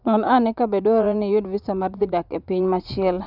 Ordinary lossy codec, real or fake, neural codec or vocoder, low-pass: none; fake; vocoder, 44.1 kHz, 80 mel bands, Vocos; 5.4 kHz